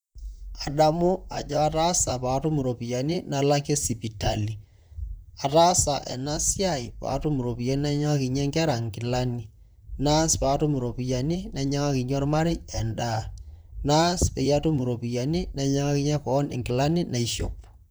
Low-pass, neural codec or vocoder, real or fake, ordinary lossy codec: none; vocoder, 44.1 kHz, 128 mel bands, Pupu-Vocoder; fake; none